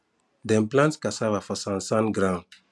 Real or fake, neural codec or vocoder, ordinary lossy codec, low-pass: real; none; none; none